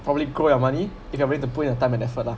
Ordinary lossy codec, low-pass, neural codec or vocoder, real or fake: none; none; none; real